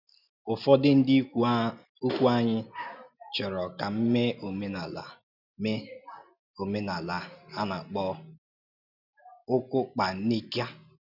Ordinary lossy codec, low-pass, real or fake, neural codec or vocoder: none; 5.4 kHz; real; none